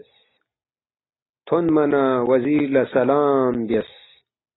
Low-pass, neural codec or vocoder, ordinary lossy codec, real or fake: 7.2 kHz; none; AAC, 16 kbps; real